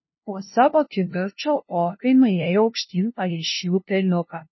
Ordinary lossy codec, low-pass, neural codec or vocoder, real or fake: MP3, 24 kbps; 7.2 kHz; codec, 16 kHz, 0.5 kbps, FunCodec, trained on LibriTTS, 25 frames a second; fake